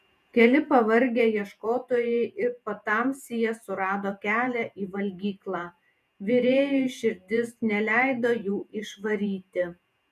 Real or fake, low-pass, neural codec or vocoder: fake; 14.4 kHz; vocoder, 48 kHz, 128 mel bands, Vocos